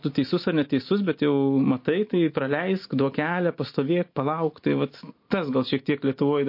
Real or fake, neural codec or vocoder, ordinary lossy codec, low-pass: real; none; MP3, 32 kbps; 5.4 kHz